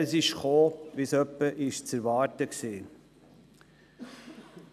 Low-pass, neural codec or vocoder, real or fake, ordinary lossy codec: 14.4 kHz; none; real; none